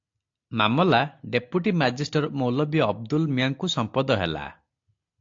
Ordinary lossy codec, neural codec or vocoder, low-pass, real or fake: AAC, 48 kbps; none; 7.2 kHz; real